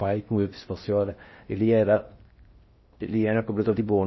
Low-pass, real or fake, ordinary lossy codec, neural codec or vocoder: 7.2 kHz; fake; MP3, 24 kbps; codec, 16 kHz in and 24 kHz out, 0.6 kbps, FocalCodec, streaming, 4096 codes